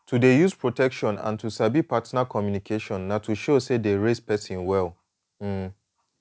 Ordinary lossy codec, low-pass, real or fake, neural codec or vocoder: none; none; real; none